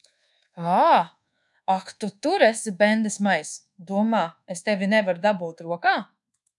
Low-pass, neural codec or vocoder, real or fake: 10.8 kHz; codec, 24 kHz, 1.2 kbps, DualCodec; fake